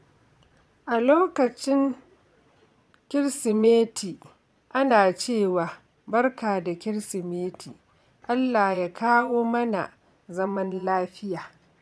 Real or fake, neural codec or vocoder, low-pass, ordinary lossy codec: fake; vocoder, 22.05 kHz, 80 mel bands, Vocos; none; none